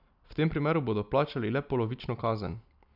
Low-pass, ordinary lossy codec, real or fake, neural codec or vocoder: 5.4 kHz; none; real; none